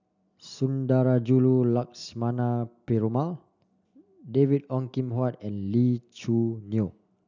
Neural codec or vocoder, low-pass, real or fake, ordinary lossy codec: none; 7.2 kHz; real; none